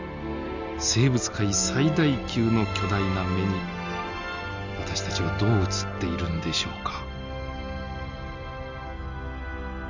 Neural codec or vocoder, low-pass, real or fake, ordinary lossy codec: none; 7.2 kHz; real; Opus, 64 kbps